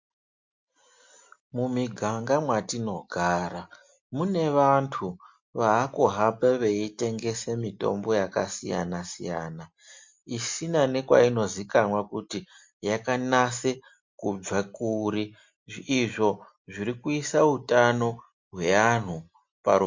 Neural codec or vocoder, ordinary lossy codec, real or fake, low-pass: none; MP3, 48 kbps; real; 7.2 kHz